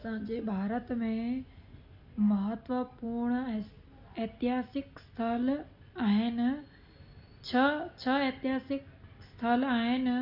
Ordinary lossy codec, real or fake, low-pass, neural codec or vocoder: none; real; 5.4 kHz; none